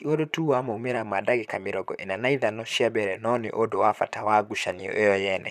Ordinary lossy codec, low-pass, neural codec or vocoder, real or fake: none; 14.4 kHz; vocoder, 44.1 kHz, 128 mel bands, Pupu-Vocoder; fake